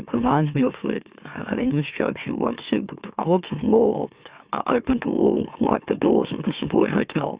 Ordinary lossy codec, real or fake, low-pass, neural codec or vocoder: Opus, 64 kbps; fake; 3.6 kHz; autoencoder, 44.1 kHz, a latent of 192 numbers a frame, MeloTTS